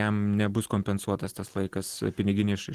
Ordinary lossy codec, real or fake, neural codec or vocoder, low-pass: Opus, 16 kbps; real; none; 14.4 kHz